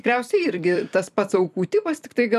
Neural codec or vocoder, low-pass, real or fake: vocoder, 44.1 kHz, 128 mel bands every 256 samples, BigVGAN v2; 14.4 kHz; fake